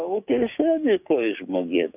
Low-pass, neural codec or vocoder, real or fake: 3.6 kHz; codec, 16 kHz, 6 kbps, DAC; fake